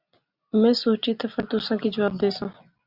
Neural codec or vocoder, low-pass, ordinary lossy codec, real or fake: none; 5.4 kHz; Opus, 64 kbps; real